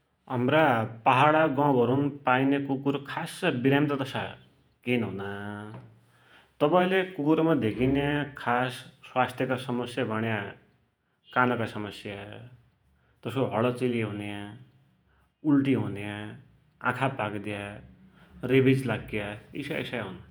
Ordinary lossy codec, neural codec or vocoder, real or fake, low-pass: none; vocoder, 48 kHz, 128 mel bands, Vocos; fake; none